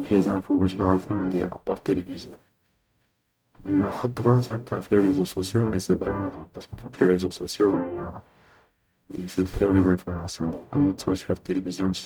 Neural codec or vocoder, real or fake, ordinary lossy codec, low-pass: codec, 44.1 kHz, 0.9 kbps, DAC; fake; none; none